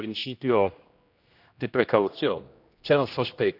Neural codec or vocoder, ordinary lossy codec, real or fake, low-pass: codec, 16 kHz, 1 kbps, X-Codec, HuBERT features, trained on general audio; none; fake; 5.4 kHz